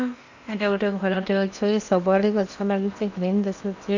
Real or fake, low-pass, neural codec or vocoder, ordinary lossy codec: fake; 7.2 kHz; codec, 16 kHz in and 24 kHz out, 0.8 kbps, FocalCodec, streaming, 65536 codes; none